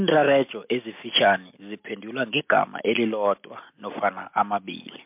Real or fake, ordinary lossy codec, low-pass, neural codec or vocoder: real; MP3, 32 kbps; 3.6 kHz; none